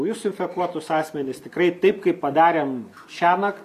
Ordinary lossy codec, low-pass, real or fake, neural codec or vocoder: AAC, 96 kbps; 14.4 kHz; real; none